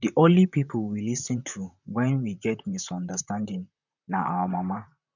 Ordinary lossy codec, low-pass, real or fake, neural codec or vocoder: none; 7.2 kHz; fake; vocoder, 44.1 kHz, 128 mel bands, Pupu-Vocoder